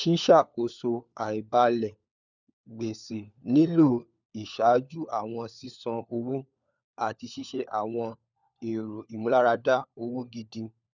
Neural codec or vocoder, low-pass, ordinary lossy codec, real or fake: codec, 16 kHz, 4 kbps, FunCodec, trained on LibriTTS, 50 frames a second; 7.2 kHz; none; fake